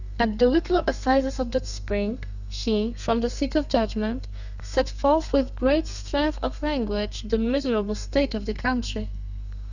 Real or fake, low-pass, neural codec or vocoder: fake; 7.2 kHz; codec, 32 kHz, 1.9 kbps, SNAC